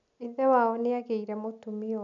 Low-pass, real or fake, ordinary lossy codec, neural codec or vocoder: 7.2 kHz; real; none; none